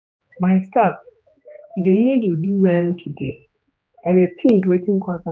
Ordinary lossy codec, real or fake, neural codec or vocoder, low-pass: none; fake; codec, 16 kHz, 2 kbps, X-Codec, HuBERT features, trained on general audio; none